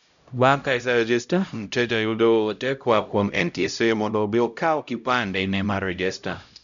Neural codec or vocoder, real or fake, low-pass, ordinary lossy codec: codec, 16 kHz, 0.5 kbps, X-Codec, HuBERT features, trained on LibriSpeech; fake; 7.2 kHz; none